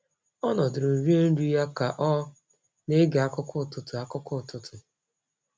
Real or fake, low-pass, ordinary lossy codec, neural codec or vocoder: real; none; none; none